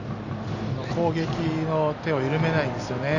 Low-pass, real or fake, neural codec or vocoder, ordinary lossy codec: 7.2 kHz; real; none; none